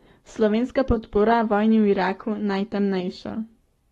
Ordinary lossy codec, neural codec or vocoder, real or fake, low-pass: AAC, 32 kbps; codec, 44.1 kHz, 7.8 kbps, Pupu-Codec; fake; 19.8 kHz